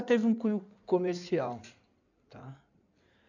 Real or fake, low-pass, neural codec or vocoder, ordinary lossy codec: fake; 7.2 kHz; codec, 16 kHz in and 24 kHz out, 2.2 kbps, FireRedTTS-2 codec; none